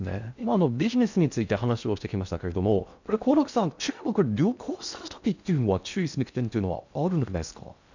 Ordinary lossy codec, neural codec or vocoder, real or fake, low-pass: none; codec, 16 kHz in and 24 kHz out, 0.6 kbps, FocalCodec, streaming, 2048 codes; fake; 7.2 kHz